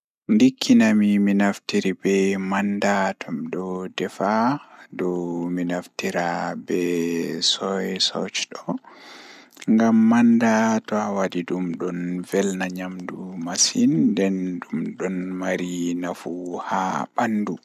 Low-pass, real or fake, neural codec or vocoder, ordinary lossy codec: 14.4 kHz; real; none; none